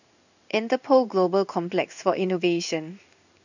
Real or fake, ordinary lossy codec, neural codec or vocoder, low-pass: fake; none; codec, 16 kHz in and 24 kHz out, 1 kbps, XY-Tokenizer; 7.2 kHz